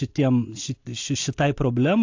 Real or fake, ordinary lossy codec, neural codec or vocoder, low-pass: real; AAC, 48 kbps; none; 7.2 kHz